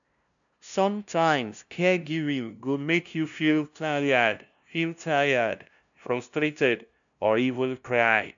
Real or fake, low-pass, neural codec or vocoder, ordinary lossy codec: fake; 7.2 kHz; codec, 16 kHz, 0.5 kbps, FunCodec, trained on LibriTTS, 25 frames a second; none